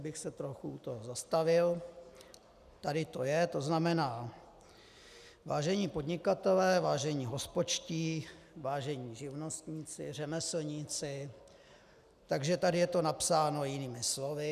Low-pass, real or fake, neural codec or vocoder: 14.4 kHz; real; none